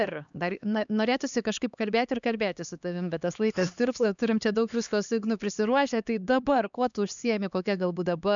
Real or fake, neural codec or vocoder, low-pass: fake; codec, 16 kHz, 2 kbps, X-Codec, HuBERT features, trained on LibriSpeech; 7.2 kHz